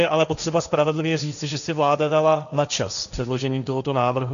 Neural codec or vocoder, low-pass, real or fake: codec, 16 kHz, 1.1 kbps, Voila-Tokenizer; 7.2 kHz; fake